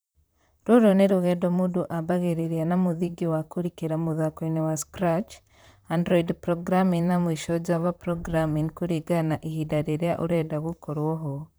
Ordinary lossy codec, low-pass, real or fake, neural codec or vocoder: none; none; fake; vocoder, 44.1 kHz, 128 mel bands, Pupu-Vocoder